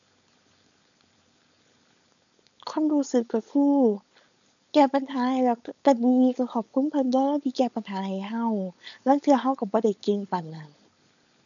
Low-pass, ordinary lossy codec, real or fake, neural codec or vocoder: 7.2 kHz; none; fake; codec, 16 kHz, 4.8 kbps, FACodec